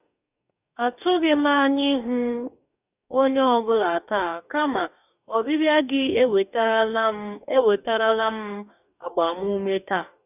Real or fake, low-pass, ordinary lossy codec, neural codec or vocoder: fake; 3.6 kHz; none; codec, 44.1 kHz, 2.6 kbps, DAC